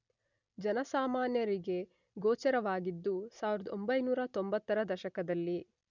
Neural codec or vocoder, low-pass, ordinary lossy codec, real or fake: none; 7.2 kHz; none; real